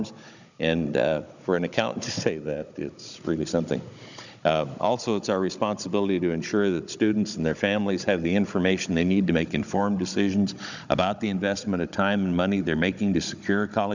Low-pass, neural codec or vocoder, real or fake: 7.2 kHz; codec, 16 kHz, 16 kbps, FunCodec, trained on Chinese and English, 50 frames a second; fake